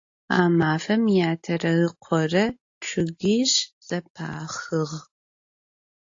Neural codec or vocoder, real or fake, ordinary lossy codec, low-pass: none; real; AAC, 48 kbps; 7.2 kHz